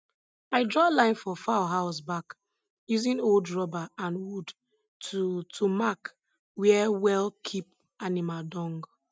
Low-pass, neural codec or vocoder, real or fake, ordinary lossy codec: none; none; real; none